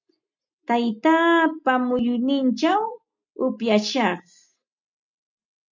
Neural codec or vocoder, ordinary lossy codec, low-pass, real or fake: none; MP3, 64 kbps; 7.2 kHz; real